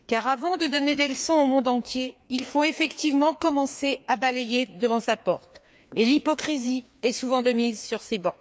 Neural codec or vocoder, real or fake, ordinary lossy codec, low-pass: codec, 16 kHz, 2 kbps, FreqCodec, larger model; fake; none; none